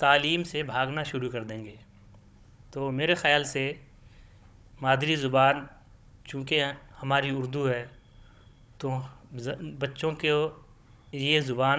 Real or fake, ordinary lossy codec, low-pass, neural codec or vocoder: fake; none; none; codec, 16 kHz, 16 kbps, FunCodec, trained on Chinese and English, 50 frames a second